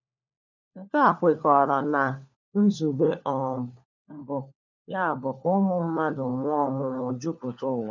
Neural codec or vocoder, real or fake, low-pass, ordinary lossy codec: codec, 16 kHz, 4 kbps, FunCodec, trained on LibriTTS, 50 frames a second; fake; 7.2 kHz; none